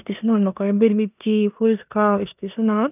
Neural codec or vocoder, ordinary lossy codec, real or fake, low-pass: codec, 16 kHz in and 24 kHz out, 0.9 kbps, LongCat-Audio-Codec, four codebook decoder; none; fake; 3.6 kHz